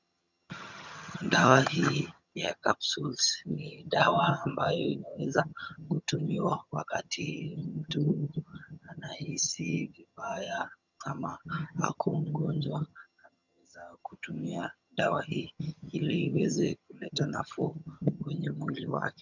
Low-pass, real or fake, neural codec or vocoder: 7.2 kHz; fake; vocoder, 22.05 kHz, 80 mel bands, HiFi-GAN